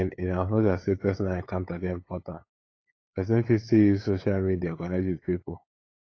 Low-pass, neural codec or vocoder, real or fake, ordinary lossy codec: 7.2 kHz; codec, 16 kHz, 8 kbps, FunCodec, trained on LibriTTS, 25 frames a second; fake; AAC, 32 kbps